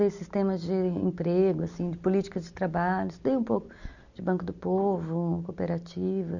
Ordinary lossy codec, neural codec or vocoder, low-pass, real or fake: none; none; 7.2 kHz; real